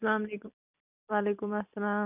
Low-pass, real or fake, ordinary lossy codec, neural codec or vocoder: 3.6 kHz; real; none; none